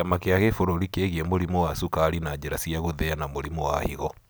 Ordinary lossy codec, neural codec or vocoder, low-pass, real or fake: none; none; none; real